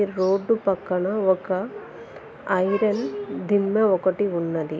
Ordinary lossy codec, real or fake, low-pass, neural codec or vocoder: none; real; none; none